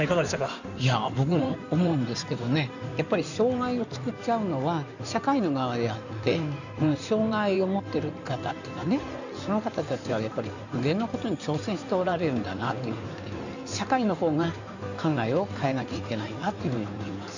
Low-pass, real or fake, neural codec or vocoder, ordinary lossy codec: 7.2 kHz; fake; codec, 16 kHz in and 24 kHz out, 2.2 kbps, FireRedTTS-2 codec; none